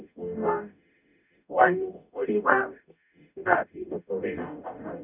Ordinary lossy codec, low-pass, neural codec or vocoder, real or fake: none; 3.6 kHz; codec, 44.1 kHz, 0.9 kbps, DAC; fake